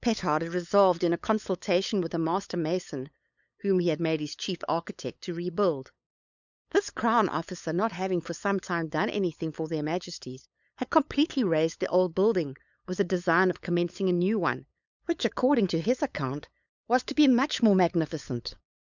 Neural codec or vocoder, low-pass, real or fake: codec, 16 kHz, 8 kbps, FunCodec, trained on LibriTTS, 25 frames a second; 7.2 kHz; fake